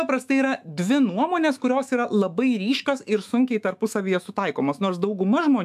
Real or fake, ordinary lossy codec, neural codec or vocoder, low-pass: fake; AAC, 96 kbps; autoencoder, 48 kHz, 128 numbers a frame, DAC-VAE, trained on Japanese speech; 14.4 kHz